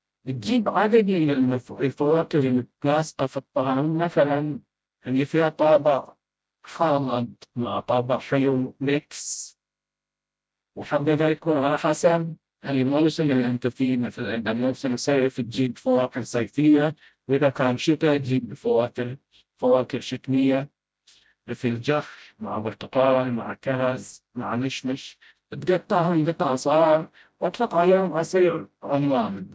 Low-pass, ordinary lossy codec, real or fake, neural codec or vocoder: none; none; fake; codec, 16 kHz, 0.5 kbps, FreqCodec, smaller model